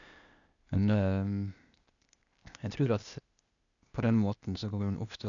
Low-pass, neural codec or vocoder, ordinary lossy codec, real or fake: 7.2 kHz; codec, 16 kHz, 0.8 kbps, ZipCodec; none; fake